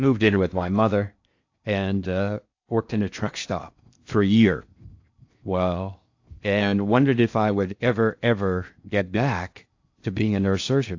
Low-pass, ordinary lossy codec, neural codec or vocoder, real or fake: 7.2 kHz; AAC, 48 kbps; codec, 16 kHz in and 24 kHz out, 0.6 kbps, FocalCodec, streaming, 4096 codes; fake